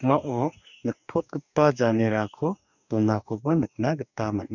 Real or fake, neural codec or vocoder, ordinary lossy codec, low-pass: fake; codec, 44.1 kHz, 2.6 kbps, DAC; none; 7.2 kHz